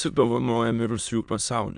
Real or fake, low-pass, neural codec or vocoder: fake; 9.9 kHz; autoencoder, 22.05 kHz, a latent of 192 numbers a frame, VITS, trained on many speakers